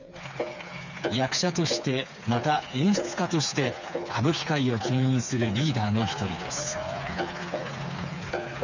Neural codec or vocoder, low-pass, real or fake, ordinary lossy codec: codec, 16 kHz, 4 kbps, FreqCodec, smaller model; 7.2 kHz; fake; none